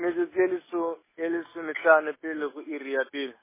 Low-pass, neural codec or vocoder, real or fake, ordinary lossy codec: 3.6 kHz; none; real; MP3, 16 kbps